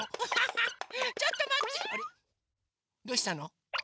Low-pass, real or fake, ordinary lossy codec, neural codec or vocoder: none; real; none; none